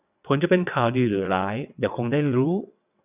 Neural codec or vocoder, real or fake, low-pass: vocoder, 22.05 kHz, 80 mel bands, WaveNeXt; fake; 3.6 kHz